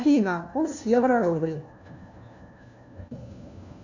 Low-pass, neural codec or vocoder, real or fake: 7.2 kHz; codec, 16 kHz, 1 kbps, FunCodec, trained on LibriTTS, 50 frames a second; fake